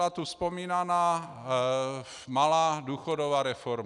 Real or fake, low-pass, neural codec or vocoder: real; 10.8 kHz; none